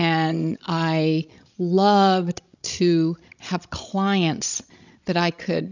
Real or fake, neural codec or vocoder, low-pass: fake; codec, 16 kHz, 16 kbps, FreqCodec, larger model; 7.2 kHz